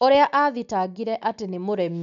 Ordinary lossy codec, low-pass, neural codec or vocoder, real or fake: none; 7.2 kHz; none; real